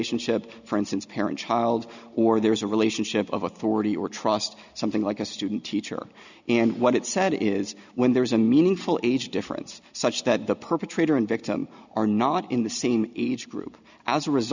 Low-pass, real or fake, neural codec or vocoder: 7.2 kHz; real; none